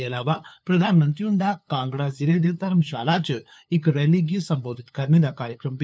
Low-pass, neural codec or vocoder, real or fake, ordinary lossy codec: none; codec, 16 kHz, 2 kbps, FunCodec, trained on LibriTTS, 25 frames a second; fake; none